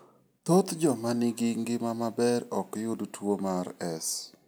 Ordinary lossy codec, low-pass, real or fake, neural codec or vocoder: none; none; real; none